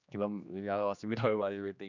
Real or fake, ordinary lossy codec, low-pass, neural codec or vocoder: fake; none; 7.2 kHz; codec, 16 kHz, 2 kbps, X-Codec, HuBERT features, trained on general audio